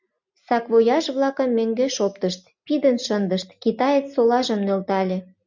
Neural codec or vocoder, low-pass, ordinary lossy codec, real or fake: none; 7.2 kHz; MP3, 64 kbps; real